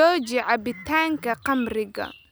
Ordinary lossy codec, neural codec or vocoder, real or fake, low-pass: none; none; real; none